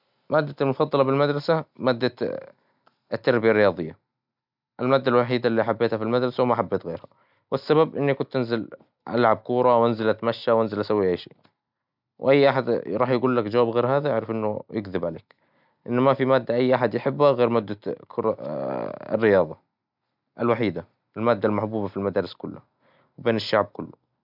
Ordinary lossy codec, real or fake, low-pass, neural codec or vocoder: none; real; 5.4 kHz; none